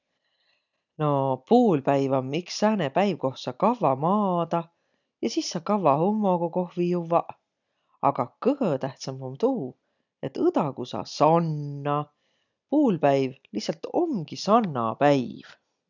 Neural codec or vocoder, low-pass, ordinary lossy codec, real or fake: none; 7.2 kHz; none; real